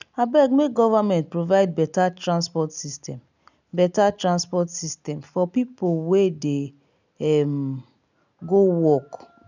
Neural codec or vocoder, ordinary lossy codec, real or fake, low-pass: none; none; real; 7.2 kHz